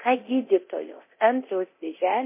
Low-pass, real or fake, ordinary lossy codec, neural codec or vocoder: 3.6 kHz; fake; MP3, 24 kbps; codec, 24 kHz, 0.9 kbps, DualCodec